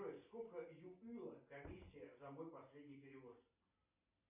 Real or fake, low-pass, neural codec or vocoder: real; 3.6 kHz; none